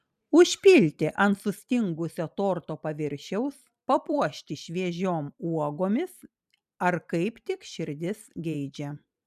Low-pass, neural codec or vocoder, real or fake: 14.4 kHz; vocoder, 44.1 kHz, 128 mel bands every 256 samples, BigVGAN v2; fake